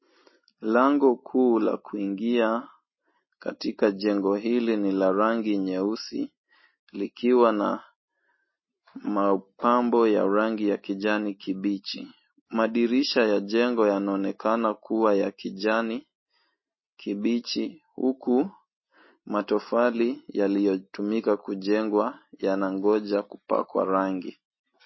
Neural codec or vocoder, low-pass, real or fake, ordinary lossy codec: none; 7.2 kHz; real; MP3, 24 kbps